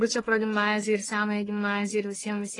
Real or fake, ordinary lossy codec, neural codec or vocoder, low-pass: fake; AAC, 32 kbps; codec, 44.1 kHz, 1.7 kbps, Pupu-Codec; 10.8 kHz